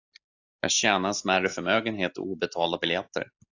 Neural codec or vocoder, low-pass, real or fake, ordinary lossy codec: none; 7.2 kHz; real; AAC, 48 kbps